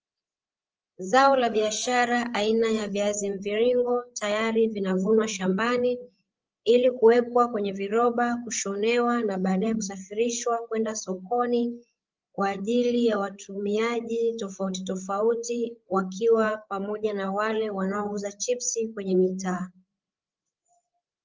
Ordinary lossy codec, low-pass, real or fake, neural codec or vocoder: Opus, 24 kbps; 7.2 kHz; fake; codec, 16 kHz, 8 kbps, FreqCodec, larger model